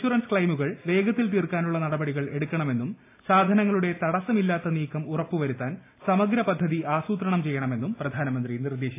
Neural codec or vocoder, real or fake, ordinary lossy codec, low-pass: none; real; AAC, 24 kbps; 3.6 kHz